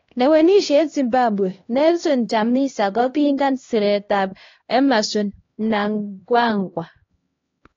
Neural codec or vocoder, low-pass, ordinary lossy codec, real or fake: codec, 16 kHz, 1 kbps, X-Codec, HuBERT features, trained on LibriSpeech; 7.2 kHz; AAC, 32 kbps; fake